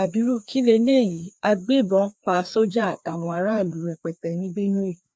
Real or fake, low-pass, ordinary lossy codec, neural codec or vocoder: fake; none; none; codec, 16 kHz, 2 kbps, FreqCodec, larger model